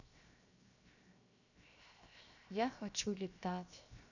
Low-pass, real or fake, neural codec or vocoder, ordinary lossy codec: 7.2 kHz; fake; codec, 16 kHz, 0.7 kbps, FocalCodec; none